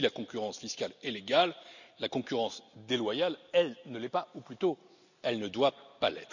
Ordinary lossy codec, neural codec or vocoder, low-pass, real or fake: none; none; 7.2 kHz; real